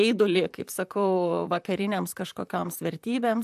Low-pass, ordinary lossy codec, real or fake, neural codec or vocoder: 14.4 kHz; AAC, 96 kbps; fake; vocoder, 44.1 kHz, 128 mel bands, Pupu-Vocoder